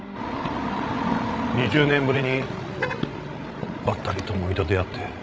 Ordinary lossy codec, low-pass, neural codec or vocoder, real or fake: none; none; codec, 16 kHz, 16 kbps, FreqCodec, larger model; fake